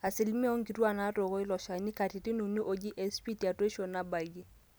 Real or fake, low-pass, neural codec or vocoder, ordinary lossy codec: real; none; none; none